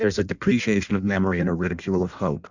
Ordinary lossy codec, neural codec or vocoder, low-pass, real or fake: Opus, 64 kbps; codec, 16 kHz in and 24 kHz out, 0.6 kbps, FireRedTTS-2 codec; 7.2 kHz; fake